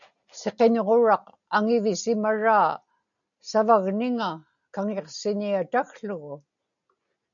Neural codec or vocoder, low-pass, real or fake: none; 7.2 kHz; real